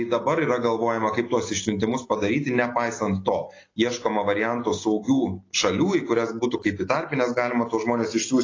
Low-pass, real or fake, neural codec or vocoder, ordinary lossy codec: 7.2 kHz; real; none; AAC, 32 kbps